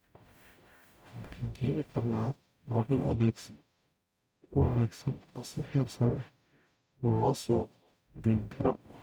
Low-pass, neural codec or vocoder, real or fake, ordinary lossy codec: none; codec, 44.1 kHz, 0.9 kbps, DAC; fake; none